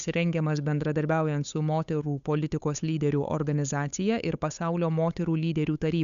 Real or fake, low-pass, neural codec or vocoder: fake; 7.2 kHz; codec, 16 kHz, 8 kbps, FunCodec, trained on Chinese and English, 25 frames a second